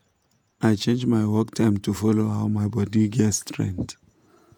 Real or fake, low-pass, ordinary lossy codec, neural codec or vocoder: fake; 19.8 kHz; none; vocoder, 48 kHz, 128 mel bands, Vocos